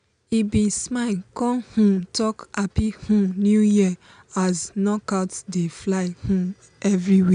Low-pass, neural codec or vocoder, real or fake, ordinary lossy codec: 9.9 kHz; none; real; none